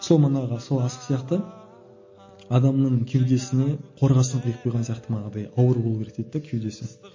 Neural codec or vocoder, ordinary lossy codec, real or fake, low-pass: none; MP3, 32 kbps; real; 7.2 kHz